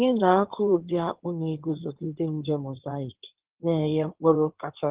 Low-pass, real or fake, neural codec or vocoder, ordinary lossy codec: 3.6 kHz; fake; codec, 16 kHz in and 24 kHz out, 2.2 kbps, FireRedTTS-2 codec; Opus, 16 kbps